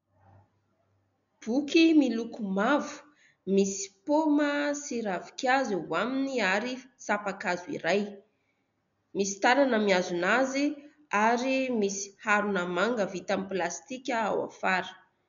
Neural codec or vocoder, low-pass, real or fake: none; 7.2 kHz; real